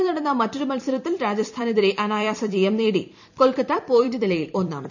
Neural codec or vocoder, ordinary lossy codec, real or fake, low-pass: vocoder, 44.1 kHz, 128 mel bands every 256 samples, BigVGAN v2; none; fake; 7.2 kHz